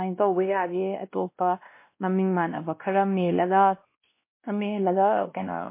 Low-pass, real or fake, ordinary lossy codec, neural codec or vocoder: 3.6 kHz; fake; MP3, 24 kbps; codec, 16 kHz, 1 kbps, X-Codec, WavLM features, trained on Multilingual LibriSpeech